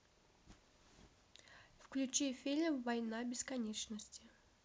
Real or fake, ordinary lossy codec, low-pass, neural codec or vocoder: real; none; none; none